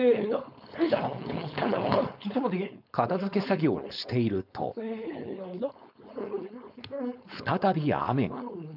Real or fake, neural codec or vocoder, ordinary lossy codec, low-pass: fake; codec, 16 kHz, 4.8 kbps, FACodec; none; 5.4 kHz